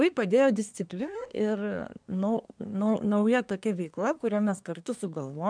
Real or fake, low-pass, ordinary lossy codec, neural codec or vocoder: fake; 9.9 kHz; MP3, 96 kbps; codec, 24 kHz, 1 kbps, SNAC